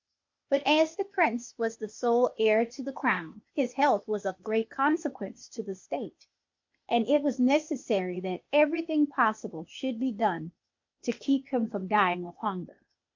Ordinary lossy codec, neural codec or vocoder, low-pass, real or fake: MP3, 48 kbps; codec, 16 kHz, 0.8 kbps, ZipCodec; 7.2 kHz; fake